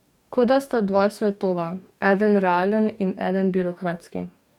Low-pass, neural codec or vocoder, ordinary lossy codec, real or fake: 19.8 kHz; codec, 44.1 kHz, 2.6 kbps, DAC; none; fake